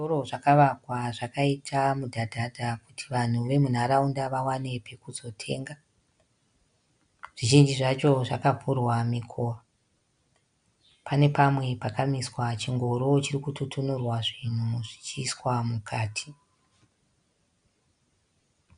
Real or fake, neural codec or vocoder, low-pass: real; none; 9.9 kHz